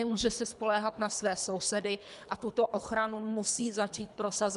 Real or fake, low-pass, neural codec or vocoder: fake; 10.8 kHz; codec, 24 kHz, 3 kbps, HILCodec